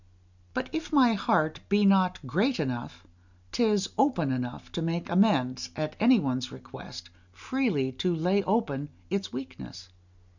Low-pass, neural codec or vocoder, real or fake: 7.2 kHz; none; real